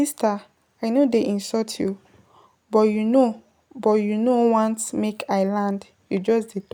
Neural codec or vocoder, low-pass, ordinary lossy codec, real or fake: none; none; none; real